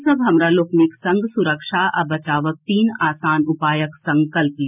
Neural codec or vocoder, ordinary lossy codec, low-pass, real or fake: none; none; 3.6 kHz; real